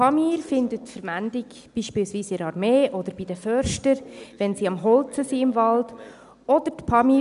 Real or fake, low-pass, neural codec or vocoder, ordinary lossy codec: real; 10.8 kHz; none; none